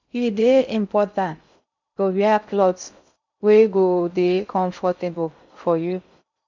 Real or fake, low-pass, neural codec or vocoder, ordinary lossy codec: fake; 7.2 kHz; codec, 16 kHz in and 24 kHz out, 0.6 kbps, FocalCodec, streaming, 2048 codes; none